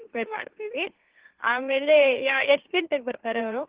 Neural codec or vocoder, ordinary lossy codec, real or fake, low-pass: autoencoder, 44.1 kHz, a latent of 192 numbers a frame, MeloTTS; Opus, 16 kbps; fake; 3.6 kHz